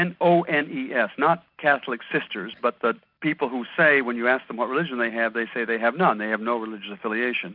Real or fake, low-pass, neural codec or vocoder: real; 5.4 kHz; none